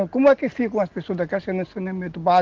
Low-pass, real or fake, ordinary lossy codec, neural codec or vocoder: 7.2 kHz; real; Opus, 16 kbps; none